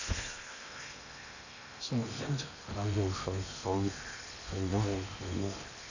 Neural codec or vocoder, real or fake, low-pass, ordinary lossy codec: codec, 16 kHz in and 24 kHz out, 0.8 kbps, FocalCodec, streaming, 65536 codes; fake; 7.2 kHz; none